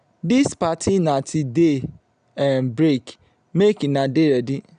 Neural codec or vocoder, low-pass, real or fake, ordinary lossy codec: none; 9.9 kHz; real; none